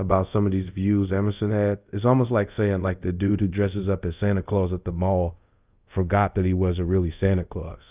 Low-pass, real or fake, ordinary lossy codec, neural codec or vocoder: 3.6 kHz; fake; Opus, 24 kbps; codec, 24 kHz, 0.5 kbps, DualCodec